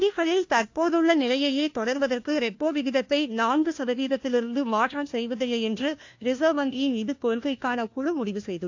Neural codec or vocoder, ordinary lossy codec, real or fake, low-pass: codec, 16 kHz, 1 kbps, FunCodec, trained on LibriTTS, 50 frames a second; AAC, 48 kbps; fake; 7.2 kHz